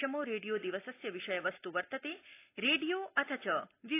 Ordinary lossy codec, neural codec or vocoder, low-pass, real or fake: AAC, 24 kbps; none; 3.6 kHz; real